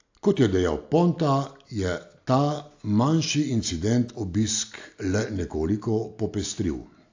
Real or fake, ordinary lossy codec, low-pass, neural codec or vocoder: real; AAC, 48 kbps; 7.2 kHz; none